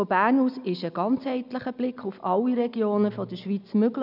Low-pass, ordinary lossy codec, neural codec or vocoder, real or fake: 5.4 kHz; none; none; real